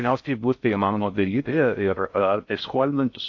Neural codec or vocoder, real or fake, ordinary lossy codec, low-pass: codec, 16 kHz in and 24 kHz out, 0.6 kbps, FocalCodec, streaming, 4096 codes; fake; AAC, 48 kbps; 7.2 kHz